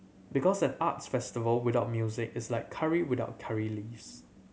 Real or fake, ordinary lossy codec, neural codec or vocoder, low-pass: real; none; none; none